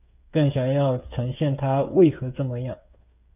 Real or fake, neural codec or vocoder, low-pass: fake; codec, 16 kHz, 8 kbps, FreqCodec, smaller model; 3.6 kHz